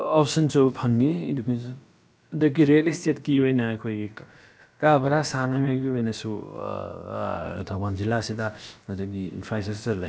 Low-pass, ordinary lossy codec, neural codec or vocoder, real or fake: none; none; codec, 16 kHz, about 1 kbps, DyCAST, with the encoder's durations; fake